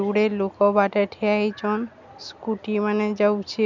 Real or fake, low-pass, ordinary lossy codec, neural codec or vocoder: real; 7.2 kHz; none; none